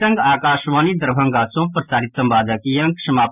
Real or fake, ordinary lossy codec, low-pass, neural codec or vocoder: real; none; 3.6 kHz; none